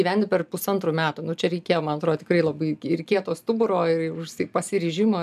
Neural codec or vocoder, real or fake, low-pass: none; real; 14.4 kHz